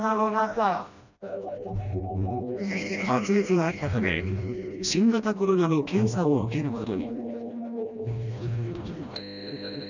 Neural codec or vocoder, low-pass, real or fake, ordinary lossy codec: codec, 16 kHz, 1 kbps, FreqCodec, smaller model; 7.2 kHz; fake; none